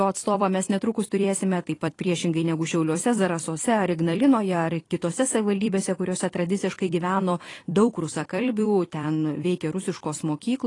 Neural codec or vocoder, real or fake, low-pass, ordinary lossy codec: vocoder, 44.1 kHz, 128 mel bands every 256 samples, BigVGAN v2; fake; 10.8 kHz; AAC, 32 kbps